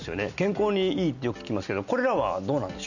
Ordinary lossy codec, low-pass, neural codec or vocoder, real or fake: none; 7.2 kHz; none; real